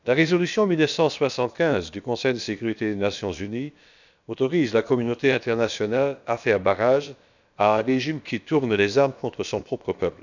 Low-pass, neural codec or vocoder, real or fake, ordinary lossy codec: 7.2 kHz; codec, 16 kHz, about 1 kbps, DyCAST, with the encoder's durations; fake; none